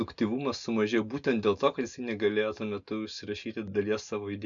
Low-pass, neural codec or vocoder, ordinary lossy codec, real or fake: 7.2 kHz; none; MP3, 96 kbps; real